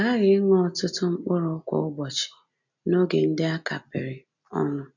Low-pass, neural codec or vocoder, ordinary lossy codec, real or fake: 7.2 kHz; none; none; real